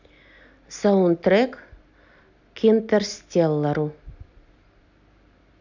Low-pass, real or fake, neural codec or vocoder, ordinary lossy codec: 7.2 kHz; real; none; none